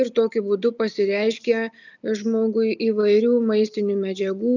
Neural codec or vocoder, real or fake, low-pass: none; real; 7.2 kHz